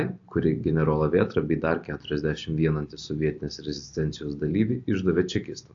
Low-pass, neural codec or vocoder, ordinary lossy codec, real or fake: 7.2 kHz; none; AAC, 48 kbps; real